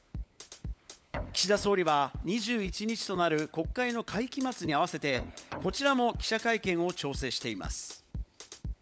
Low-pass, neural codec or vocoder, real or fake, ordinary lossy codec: none; codec, 16 kHz, 16 kbps, FunCodec, trained on LibriTTS, 50 frames a second; fake; none